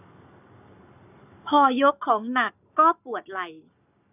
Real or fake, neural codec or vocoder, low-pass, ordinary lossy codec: fake; codec, 44.1 kHz, 7.8 kbps, Pupu-Codec; 3.6 kHz; none